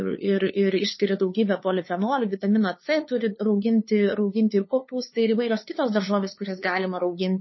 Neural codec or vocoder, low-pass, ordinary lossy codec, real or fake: codec, 16 kHz, 2 kbps, FunCodec, trained on LibriTTS, 25 frames a second; 7.2 kHz; MP3, 24 kbps; fake